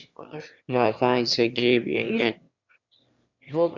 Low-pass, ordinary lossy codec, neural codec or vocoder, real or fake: 7.2 kHz; Opus, 64 kbps; autoencoder, 22.05 kHz, a latent of 192 numbers a frame, VITS, trained on one speaker; fake